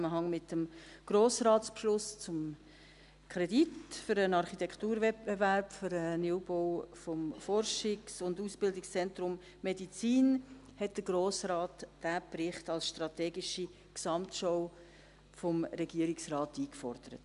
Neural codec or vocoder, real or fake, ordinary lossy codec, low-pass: none; real; none; 10.8 kHz